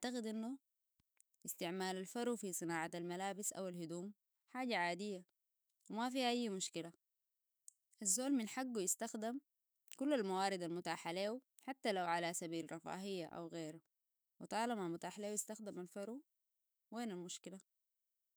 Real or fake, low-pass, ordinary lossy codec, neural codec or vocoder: fake; none; none; autoencoder, 48 kHz, 128 numbers a frame, DAC-VAE, trained on Japanese speech